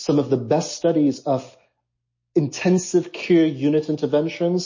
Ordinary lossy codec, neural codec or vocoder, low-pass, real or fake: MP3, 32 kbps; none; 7.2 kHz; real